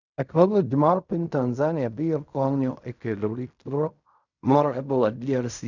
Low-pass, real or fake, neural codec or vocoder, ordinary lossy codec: 7.2 kHz; fake; codec, 16 kHz in and 24 kHz out, 0.4 kbps, LongCat-Audio-Codec, fine tuned four codebook decoder; none